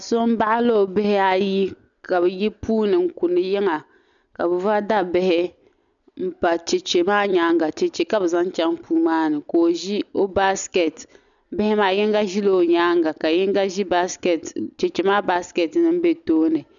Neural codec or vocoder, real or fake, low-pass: none; real; 7.2 kHz